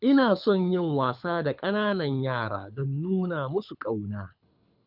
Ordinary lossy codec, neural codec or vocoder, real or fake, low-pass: none; codec, 24 kHz, 6 kbps, HILCodec; fake; 5.4 kHz